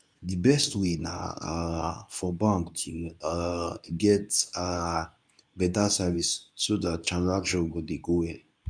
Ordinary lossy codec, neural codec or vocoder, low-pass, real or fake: none; codec, 24 kHz, 0.9 kbps, WavTokenizer, medium speech release version 2; 9.9 kHz; fake